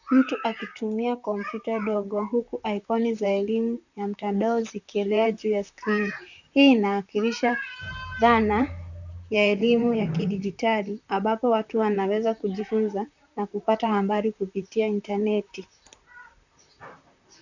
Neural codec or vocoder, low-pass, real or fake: vocoder, 44.1 kHz, 128 mel bands, Pupu-Vocoder; 7.2 kHz; fake